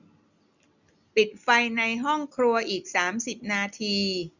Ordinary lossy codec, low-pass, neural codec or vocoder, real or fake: none; 7.2 kHz; none; real